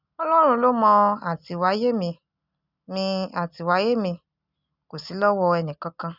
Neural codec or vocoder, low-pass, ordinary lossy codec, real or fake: none; 5.4 kHz; none; real